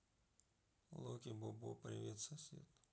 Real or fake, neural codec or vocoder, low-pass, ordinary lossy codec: real; none; none; none